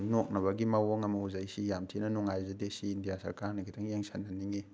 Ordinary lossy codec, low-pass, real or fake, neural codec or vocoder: none; none; real; none